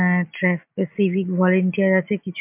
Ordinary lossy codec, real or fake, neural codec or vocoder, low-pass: MP3, 32 kbps; real; none; 3.6 kHz